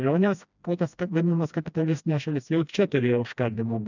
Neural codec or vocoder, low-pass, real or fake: codec, 16 kHz, 1 kbps, FreqCodec, smaller model; 7.2 kHz; fake